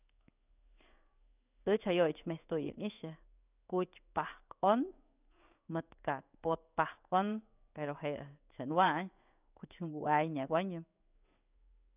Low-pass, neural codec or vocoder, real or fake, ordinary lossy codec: 3.6 kHz; codec, 16 kHz in and 24 kHz out, 1 kbps, XY-Tokenizer; fake; none